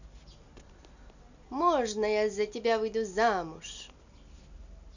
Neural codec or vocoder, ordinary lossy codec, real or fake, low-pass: none; none; real; 7.2 kHz